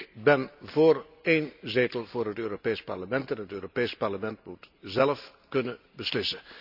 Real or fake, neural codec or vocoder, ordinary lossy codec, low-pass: real; none; none; 5.4 kHz